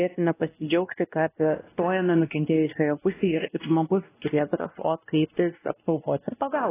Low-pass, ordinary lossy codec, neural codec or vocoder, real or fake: 3.6 kHz; AAC, 16 kbps; codec, 16 kHz, 1 kbps, X-Codec, WavLM features, trained on Multilingual LibriSpeech; fake